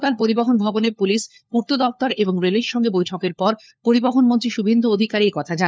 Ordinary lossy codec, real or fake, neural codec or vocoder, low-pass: none; fake; codec, 16 kHz, 4 kbps, FunCodec, trained on LibriTTS, 50 frames a second; none